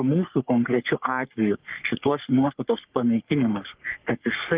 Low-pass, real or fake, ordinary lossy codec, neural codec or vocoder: 3.6 kHz; fake; Opus, 32 kbps; codec, 44.1 kHz, 3.4 kbps, Pupu-Codec